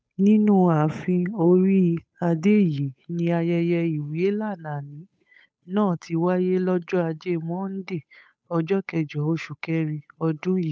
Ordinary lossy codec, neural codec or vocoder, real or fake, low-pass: none; codec, 16 kHz, 8 kbps, FunCodec, trained on Chinese and English, 25 frames a second; fake; none